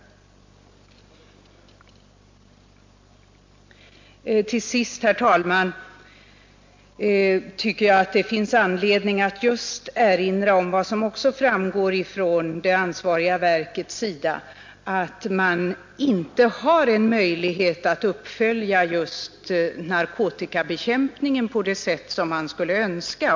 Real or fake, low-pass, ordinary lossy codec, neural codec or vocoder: real; 7.2 kHz; MP3, 48 kbps; none